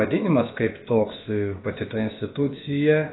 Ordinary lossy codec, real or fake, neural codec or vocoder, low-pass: AAC, 16 kbps; fake; codec, 16 kHz, about 1 kbps, DyCAST, with the encoder's durations; 7.2 kHz